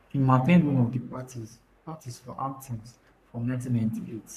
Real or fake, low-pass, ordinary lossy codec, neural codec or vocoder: fake; 14.4 kHz; none; codec, 44.1 kHz, 3.4 kbps, Pupu-Codec